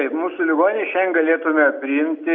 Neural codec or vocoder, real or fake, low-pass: none; real; 7.2 kHz